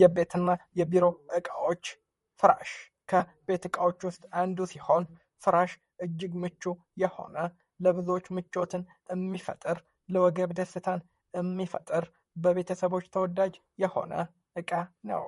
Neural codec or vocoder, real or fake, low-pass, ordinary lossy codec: vocoder, 44.1 kHz, 128 mel bands, Pupu-Vocoder; fake; 19.8 kHz; MP3, 48 kbps